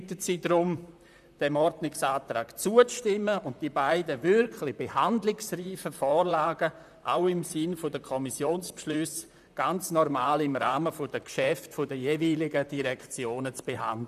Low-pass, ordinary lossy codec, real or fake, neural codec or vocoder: 14.4 kHz; none; fake; vocoder, 44.1 kHz, 128 mel bands, Pupu-Vocoder